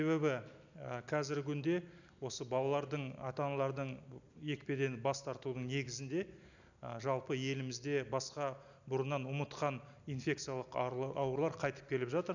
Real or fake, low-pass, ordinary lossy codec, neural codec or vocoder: real; 7.2 kHz; none; none